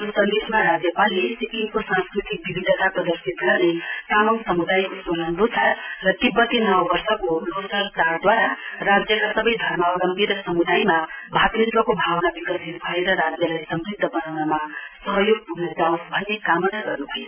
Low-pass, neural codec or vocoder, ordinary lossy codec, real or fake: 3.6 kHz; none; none; real